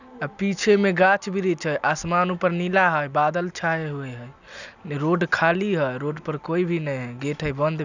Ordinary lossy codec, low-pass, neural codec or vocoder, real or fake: none; 7.2 kHz; none; real